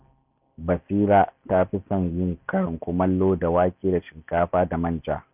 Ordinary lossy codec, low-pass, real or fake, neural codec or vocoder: none; 3.6 kHz; real; none